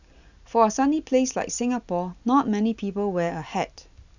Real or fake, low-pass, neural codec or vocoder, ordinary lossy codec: real; 7.2 kHz; none; none